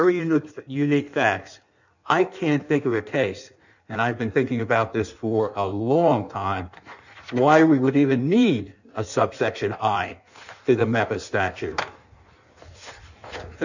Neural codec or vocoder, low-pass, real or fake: codec, 16 kHz in and 24 kHz out, 1.1 kbps, FireRedTTS-2 codec; 7.2 kHz; fake